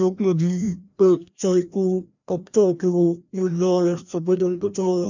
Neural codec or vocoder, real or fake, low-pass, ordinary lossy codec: codec, 16 kHz, 1 kbps, FreqCodec, larger model; fake; 7.2 kHz; none